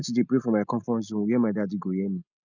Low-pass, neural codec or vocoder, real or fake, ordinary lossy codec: 7.2 kHz; none; real; none